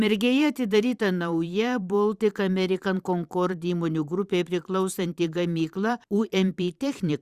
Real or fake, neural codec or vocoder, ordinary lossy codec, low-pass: fake; vocoder, 44.1 kHz, 128 mel bands every 512 samples, BigVGAN v2; Opus, 64 kbps; 14.4 kHz